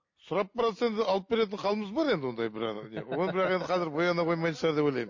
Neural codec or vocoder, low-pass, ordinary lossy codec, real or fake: none; 7.2 kHz; MP3, 32 kbps; real